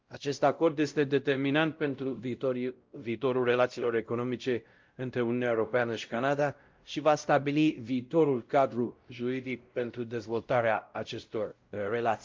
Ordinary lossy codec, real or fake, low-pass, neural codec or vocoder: Opus, 32 kbps; fake; 7.2 kHz; codec, 16 kHz, 0.5 kbps, X-Codec, WavLM features, trained on Multilingual LibriSpeech